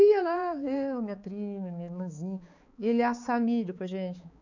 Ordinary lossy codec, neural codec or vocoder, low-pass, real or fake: none; codec, 16 kHz, 4 kbps, X-Codec, HuBERT features, trained on balanced general audio; 7.2 kHz; fake